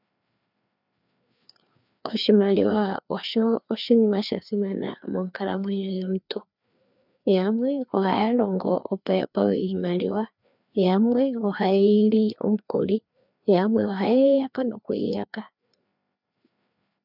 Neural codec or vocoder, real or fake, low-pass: codec, 16 kHz, 2 kbps, FreqCodec, larger model; fake; 5.4 kHz